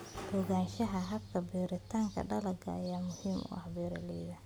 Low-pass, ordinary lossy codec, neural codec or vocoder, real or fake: none; none; none; real